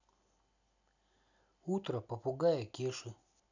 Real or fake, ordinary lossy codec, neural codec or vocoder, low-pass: real; none; none; 7.2 kHz